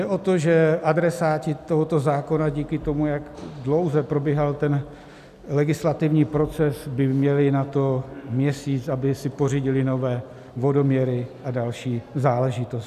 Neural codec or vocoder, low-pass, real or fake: none; 14.4 kHz; real